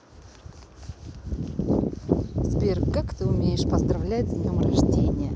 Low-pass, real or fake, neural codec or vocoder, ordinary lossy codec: none; real; none; none